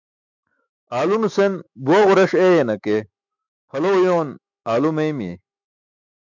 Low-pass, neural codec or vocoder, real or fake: 7.2 kHz; autoencoder, 48 kHz, 128 numbers a frame, DAC-VAE, trained on Japanese speech; fake